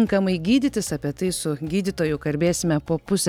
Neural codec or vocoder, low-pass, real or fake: none; 19.8 kHz; real